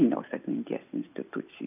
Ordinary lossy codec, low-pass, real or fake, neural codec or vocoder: AAC, 32 kbps; 3.6 kHz; real; none